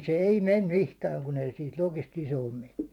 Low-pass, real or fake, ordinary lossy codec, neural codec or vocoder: 19.8 kHz; fake; none; vocoder, 44.1 kHz, 128 mel bands every 512 samples, BigVGAN v2